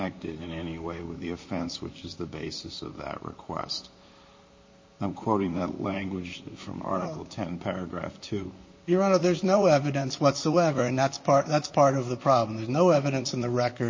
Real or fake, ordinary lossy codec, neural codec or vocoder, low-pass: fake; MP3, 32 kbps; vocoder, 44.1 kHz, 128 mel bands, Pupu-Vocoder; 7.2 kHz